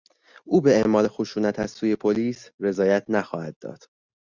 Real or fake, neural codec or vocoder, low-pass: real; none; 7.2 kHz